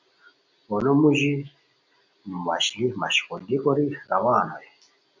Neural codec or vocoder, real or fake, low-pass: none; real; 7.2 kHz